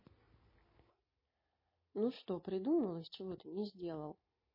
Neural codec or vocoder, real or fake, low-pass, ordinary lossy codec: none; real; 5.4 kHz; MP3, 24 kbps